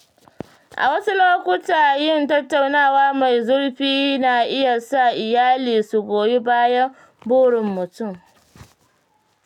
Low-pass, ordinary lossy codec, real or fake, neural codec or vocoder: 19.8 kHz; none; real; none